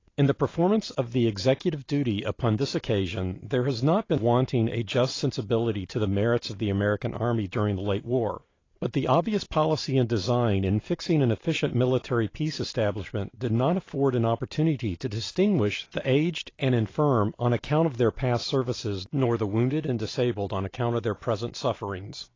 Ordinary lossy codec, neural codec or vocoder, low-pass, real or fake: AAC, 32 kbps; vocoder, 44.1 kHz, 128 mel bands every 512 samples, BigVGAN v2; 7.2 kHz; fake